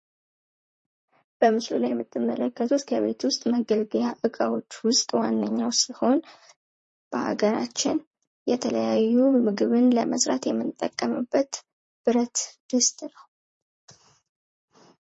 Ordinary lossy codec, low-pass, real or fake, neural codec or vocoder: MP3, 32 kbps; 10.8 kHz; fake; vocoder, 44.1 kHz, 128 mel bands, Pupu-Vocoder